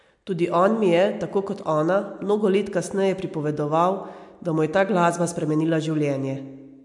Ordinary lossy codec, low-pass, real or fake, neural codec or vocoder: MP3, 64 kbps; 10.8 kHz; real; none